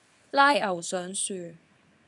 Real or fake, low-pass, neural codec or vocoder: fake; 10.8 kHz; codec, 24 kHz, 0.9 kbps, WavTokenizer, small release